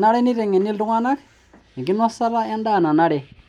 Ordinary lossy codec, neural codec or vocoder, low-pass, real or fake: none; none; 19.8 kHz; real